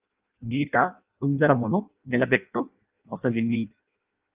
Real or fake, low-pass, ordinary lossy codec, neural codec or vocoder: fake; 3.6 kHz; Opus, 24 kbps; codec, 16 kHz in and 24 kHz out, 0.6 kbps, FireRedTTS-2 codec